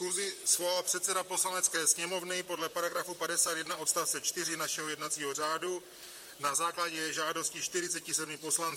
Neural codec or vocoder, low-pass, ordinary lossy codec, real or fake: vocoder, 44.1 kHz, 128 mel bands, Pupu-Vocoder; 19.8 kHz; MP3, 64 kbps; fake